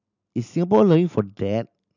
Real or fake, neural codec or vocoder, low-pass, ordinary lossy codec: real; none; 7.2 kHz; none